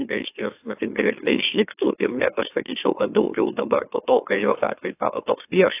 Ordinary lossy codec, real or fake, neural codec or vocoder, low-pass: AAC, 24 kbps; fake; autoencoder, 44.1 kHz, a latent of 192 numbers a frame, MeloTTS; 3.6 kHz